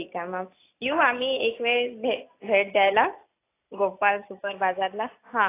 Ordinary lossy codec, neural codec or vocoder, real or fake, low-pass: AAC, 24 kbps; none; real; 3.6 kHz